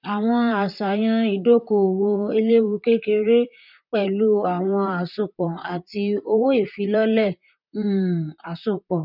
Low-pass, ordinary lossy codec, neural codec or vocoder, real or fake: 5.4 kHz; none; vocoder, 44.1 kHz, 128 mel bands, Pupu-Vocoder; fake